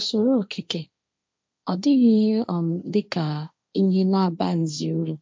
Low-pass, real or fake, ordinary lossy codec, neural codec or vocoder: none; fake; none; codec, 16 kHz, 1.1 kbps, Voila-Tokenizer